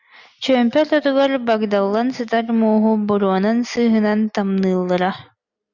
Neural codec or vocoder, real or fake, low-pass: none; real; 7.2 kHz